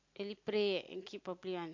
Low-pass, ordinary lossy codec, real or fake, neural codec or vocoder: 7.2 kHz; MP3, 48 kbps; real; none